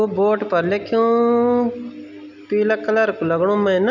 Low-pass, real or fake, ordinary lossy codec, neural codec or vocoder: 7.2 kHz; real; none; none